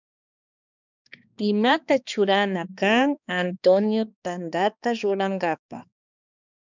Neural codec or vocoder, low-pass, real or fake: codec, 16 kHz, 2 kbps, X-Codec, HuBERT features, trained on balanced general audio; 7.2 kHz; fake